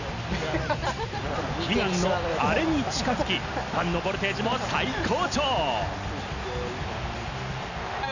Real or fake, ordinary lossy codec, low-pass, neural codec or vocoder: real; none; 7.2 kHz; none